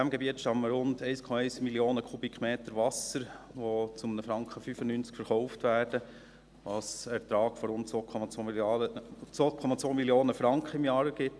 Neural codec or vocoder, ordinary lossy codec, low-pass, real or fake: none; none; none; real